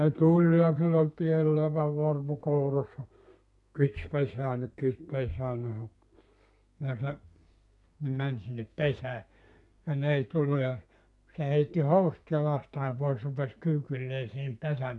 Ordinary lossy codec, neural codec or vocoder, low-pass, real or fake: MP3, 96 kbps; codec, 32 kHz, 1.9 kbps, SNAC; 10.8 kHz; fake